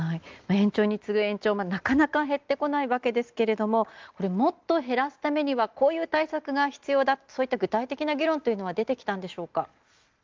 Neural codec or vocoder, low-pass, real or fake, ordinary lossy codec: none; 7.2 kHz; real; Opus, 24 kbps